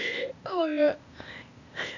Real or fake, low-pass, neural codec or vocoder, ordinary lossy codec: fake; 7.2 kHz; codec, 16 kHz, 0.8 kbps, ZipCodec; none